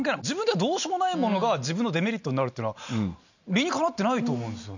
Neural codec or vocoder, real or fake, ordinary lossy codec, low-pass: none; real; none; 7.2 kHz